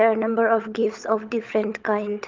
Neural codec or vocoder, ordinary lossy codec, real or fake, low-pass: codec, 16 kHz, 16 kbps, FunCodec, trained on LibriTTS, 50 frames a second; Opus, 32 kbps; fake; 7.2 kHz